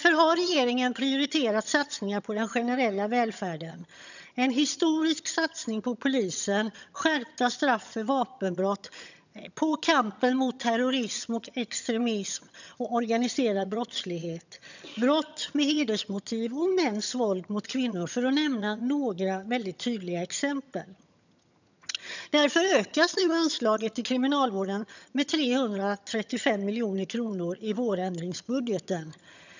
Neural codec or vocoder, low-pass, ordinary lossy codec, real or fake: vocoder, 22.05 kHz, 80 mel bands, HiFi-GAN; 7.2 kHz; none; fake